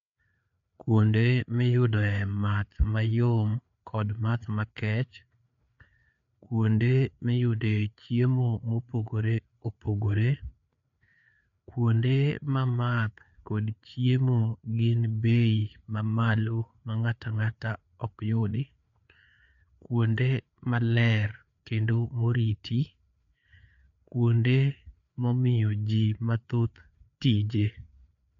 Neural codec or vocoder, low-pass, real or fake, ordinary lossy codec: codec, 16 kHz, 4 kbps, FreqCodec, larger model; 7.2 kHz; fake; none